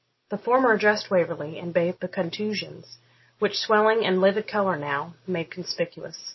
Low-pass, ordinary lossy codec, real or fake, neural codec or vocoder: 7.2 kHz; MP3, 24 kbps; real; none